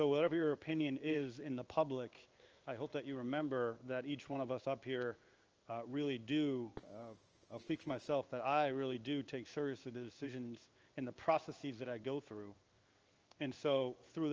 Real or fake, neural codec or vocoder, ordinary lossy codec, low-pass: fake; codec, 16 kHz in and 24 kHz out, 1 kbps, XY-Tokenizer; Opus, 24 kbps; 7.2 kHz